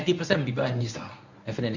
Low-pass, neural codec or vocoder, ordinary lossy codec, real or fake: 7.2 kHz; codec, 24 kHz, 0.9 kbps, WavTokenizer, medium speech release version 1; none; fake